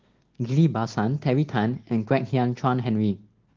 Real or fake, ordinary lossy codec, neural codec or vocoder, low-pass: real; Opus, 16 kbps; none; 7.2 kHz